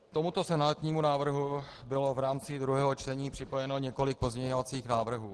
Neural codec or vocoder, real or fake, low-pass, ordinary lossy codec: none; real; 10.8 kHz; Opus, 16 kbps